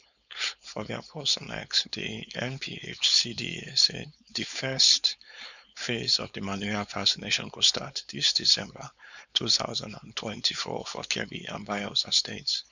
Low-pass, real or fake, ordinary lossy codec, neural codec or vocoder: 7.2 kHz; fake; none; codec, 16 kHz, 4.8 kbps, FACodec